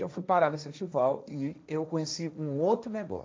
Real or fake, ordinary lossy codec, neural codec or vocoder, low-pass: fake; none; codec, 16 kHz, 1.1 kbps, Voila-Tokenizer; none